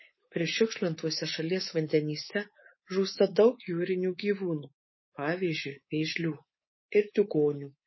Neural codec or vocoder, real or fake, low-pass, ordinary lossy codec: codec, 24 kHz, 3.1 kbps, DualCodec; fake; 7.2 kHz; MP3, 24 kbps